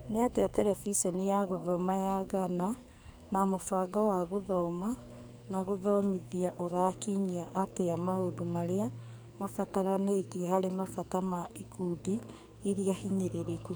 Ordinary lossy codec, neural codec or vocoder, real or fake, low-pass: none; codec, 44.1 kHz, 2.6 kbps, SNAC; fake; none